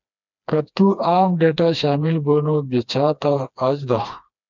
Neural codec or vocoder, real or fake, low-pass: codec, 16 kHz, 2 kbps, FreqCodec, smaller model; fake; 7.2 kHz